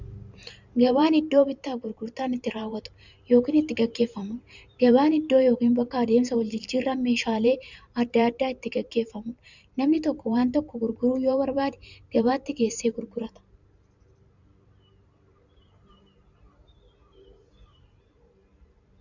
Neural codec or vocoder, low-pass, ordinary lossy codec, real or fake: vocoder, 44.1 kHz, 128 mel bands every 512 samples, BigVGAN v2; 7.2 kHz; Opus, 64 kbps; fake